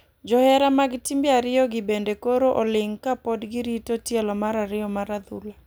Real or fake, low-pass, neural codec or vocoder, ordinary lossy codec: real; none; none; none